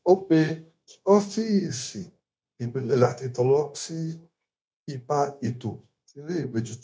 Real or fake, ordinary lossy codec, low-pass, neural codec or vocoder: fake; none; none; codec, 16 kHz, 0.9 kbps, LongCat-Audio-Codec